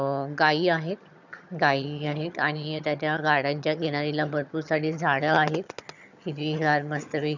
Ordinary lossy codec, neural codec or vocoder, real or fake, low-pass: none; vocoder, 22.05 kHz, 80 mel bands, HiFi-GAN; fake; 7.2 kHz